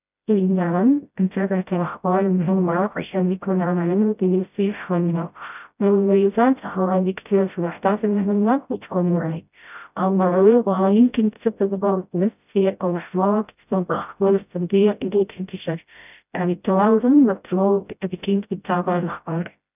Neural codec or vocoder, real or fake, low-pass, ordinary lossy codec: codec, 16 kHz, 0.5 kbps, FreqCodec, smaller model; fake; 3.6 kHz; none